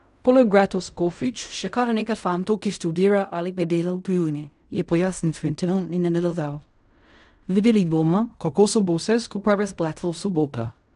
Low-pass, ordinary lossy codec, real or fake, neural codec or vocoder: 10.8 kHz; none; fake; codec, 16 kHz in and 24 kHz out, 0.4 kbps, LongCat-Audio-Codec, fine tuned four codebook decoder